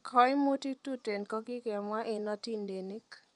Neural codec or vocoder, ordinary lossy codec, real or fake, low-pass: vocoder, 24 kHz, 100 mel bands, Vocos; none; fake; 10.8 kHz